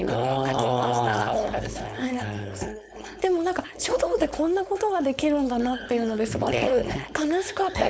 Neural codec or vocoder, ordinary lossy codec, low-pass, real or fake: codec, 16 kHz, 4.8 kbps, FACodec; none; none; fake